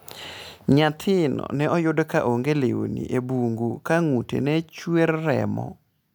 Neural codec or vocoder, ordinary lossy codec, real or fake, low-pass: none; none; real; none